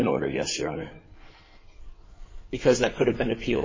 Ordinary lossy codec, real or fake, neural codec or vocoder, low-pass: MP3, 32 kbps; fake; codec, 16 kHz in and 24 kHz out, 2.2 kbps, FireRedTTS-2 codec; 7.2 kHz